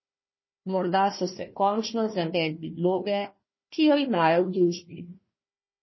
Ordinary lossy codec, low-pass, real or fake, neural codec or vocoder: MP3, 24 kbps; 7.2 kHz; fake; codec, 16 kHz, 1 kbps, FunCodec, trained on Chinese and English, 50 frames a second